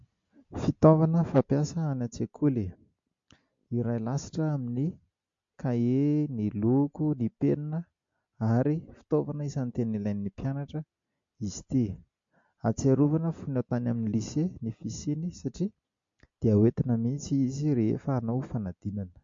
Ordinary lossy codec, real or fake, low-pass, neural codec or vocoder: MP3, 48 kbps; real; 7.2 kHz; none